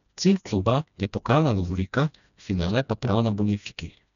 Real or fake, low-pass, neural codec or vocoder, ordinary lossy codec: fake; 7.2 kHz; codec, 16 kHz, 1 kbps, FreqCodec, smaller model; none